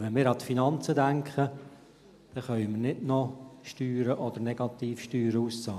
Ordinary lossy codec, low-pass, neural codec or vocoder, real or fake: none; 14.4 kHz; none; real